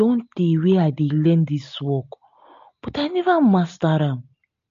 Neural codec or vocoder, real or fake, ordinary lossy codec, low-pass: none; real; MP3, 48 kbps; 7.2 kHz